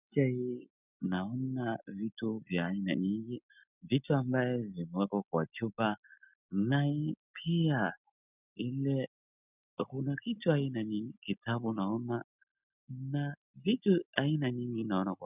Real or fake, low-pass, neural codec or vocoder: real; 3.6 kHz; none